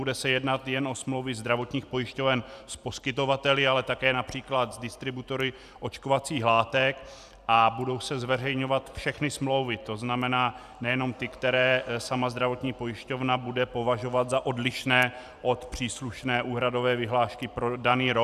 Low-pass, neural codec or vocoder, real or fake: 14.4 kHz; vocoder, 44.1 kHz, 128 mel bands every 256 samples, BigVGAN v2; fake